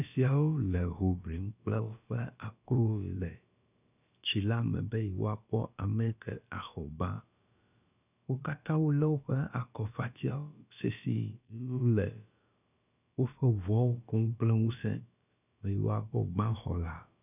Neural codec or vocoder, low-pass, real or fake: codec, 16 kHz, about 1 kbps, DyCAST, with the encoder's durations; 3.6 kHz; fake